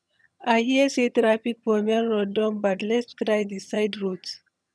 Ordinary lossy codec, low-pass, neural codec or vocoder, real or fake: none; none; vocoder, 22.05 kHz, 80 mel bands, HiFi-GAN; fake